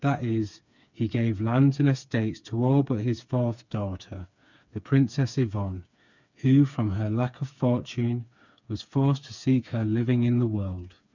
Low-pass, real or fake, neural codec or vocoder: 7.2 kHz; fake; codec, 16 kHz, 4 kbps, FreqCodec, smaller model